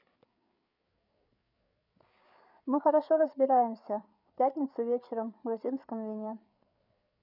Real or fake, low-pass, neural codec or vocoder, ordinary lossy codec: fake; 5.4 kHz; codec, 16 kHz, 8 kbps, FreqCodec, larger model; none